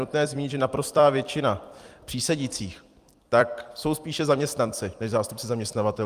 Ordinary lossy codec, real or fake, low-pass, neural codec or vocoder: Opus, 32 kbps; fake; 14.4 kHz; vocoder, 44.1 kHz, 128 mel bands every 256 samples, BigVGAN v2